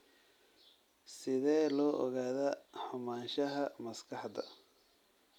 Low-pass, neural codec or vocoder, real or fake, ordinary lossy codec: 19.8 kHz; none; real; none